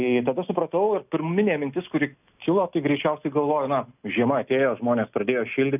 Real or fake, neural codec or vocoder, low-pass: real; none; 3.6 kHz